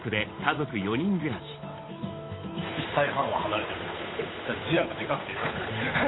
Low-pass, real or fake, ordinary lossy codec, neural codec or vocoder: 7.2 kHz; fake; AAC, 16 kbps; codec, 16 kHz, 8 kbps, FunCodec, trained on Chinese and English, 25 frames a second